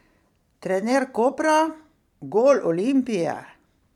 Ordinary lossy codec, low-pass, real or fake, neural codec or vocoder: none; 19.8 kHz; fake; vocoder, 44.1 kHz, 128 mel bands every 512 samples, BigVGAN v2